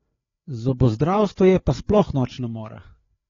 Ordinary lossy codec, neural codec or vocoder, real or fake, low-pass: AAC, 32 kbps; codec, 16 kHz, 4 kbps, FreqCodec, larger model; fake; 7.2 kHz